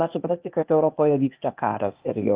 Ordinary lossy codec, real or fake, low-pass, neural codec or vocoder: Opus, 32 kbps; fake; 3.6 kHz; codec, 16 kHz, 0.8 kbps, ZipCodec